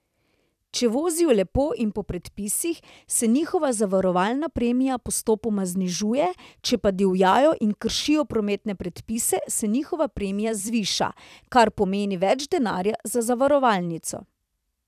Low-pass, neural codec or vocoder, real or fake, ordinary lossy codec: 14.4 kHz; vocoder, 44.1 kHz, 128 mel bands every 256 samples, BigVGAN v2; fake; none